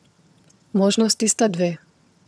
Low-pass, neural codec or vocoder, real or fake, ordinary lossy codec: none; vocoder, 22.05 kHz, 80 mel bands, HiFi-GAN; fake; none